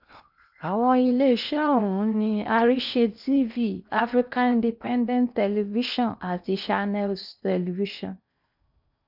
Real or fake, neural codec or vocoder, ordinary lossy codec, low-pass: fake; codec, 16 kHz in and 24 kHz out, 0.8 kbps, FocalCodec, streaming, 65536 codes; none; 5.4 kHz